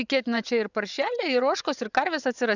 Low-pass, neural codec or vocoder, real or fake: 7.2 kHz; vocoder, 22.05 kHz, 80 mel bands, WaveNeXt; fake